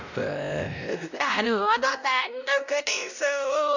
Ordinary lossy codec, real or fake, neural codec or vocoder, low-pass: none; fake; codec, 16 kHz, 0.5 kbps, X-Codec, WavLM features, trained on Multilingual LibriSpeech; 7.2 kHz